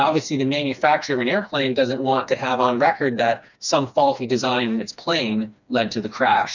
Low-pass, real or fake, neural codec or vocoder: 7.2 kHz; fake; codec, 16 kHz, 2 kbps, FreqCodec, smaller model